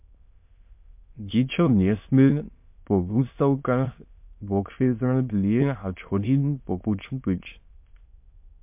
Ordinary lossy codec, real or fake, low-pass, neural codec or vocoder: MP3, 32 kbps; fake; 3.6 kHz; autoencoder, 22.05 kHz, a latent of 192 numbers a frame, VITS, trained on many speakers